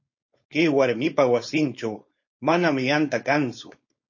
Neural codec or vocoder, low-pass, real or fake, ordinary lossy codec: codec, 16 kHz, 4.8 kbps, FACodec; 7.2 kHz; fake; MP3, 32 kbps